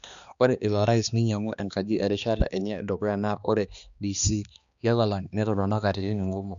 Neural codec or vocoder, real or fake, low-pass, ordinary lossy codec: codec, 16 kHz, 2 kbps, X-Codec, HuBERT features, trained on balanced general audio; fake; 7.2 kHz; none